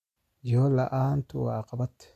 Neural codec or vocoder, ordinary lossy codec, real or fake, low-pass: none; MP3, 64 kbps; real; 14.4 kHz